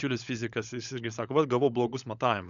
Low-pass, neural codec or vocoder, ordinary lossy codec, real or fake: 7.2 kHz; codec, 16 kHz, 16 kbps, FunCodec, trained on LibriTTS, 50 frames a second; MP3, 96 kbps; fake